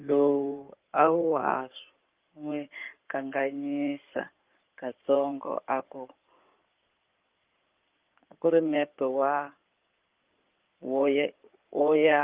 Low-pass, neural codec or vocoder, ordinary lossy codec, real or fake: 3.6 kHz; codec, 16 kHz in and 24 kHz out, 2.2 kbps, FireRedTTS-2 codec; Opus, 32 kbps; fake